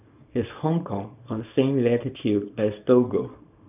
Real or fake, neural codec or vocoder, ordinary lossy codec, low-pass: fake; codec, 24 kHz, 0.9 kbps, WavTokenizer, small release; none; 3.6 kHz